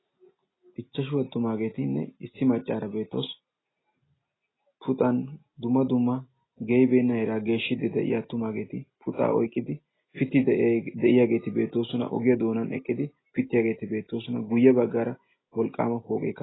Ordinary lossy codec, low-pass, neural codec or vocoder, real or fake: AAC, 16 kbps; 7.2 kHz; none; real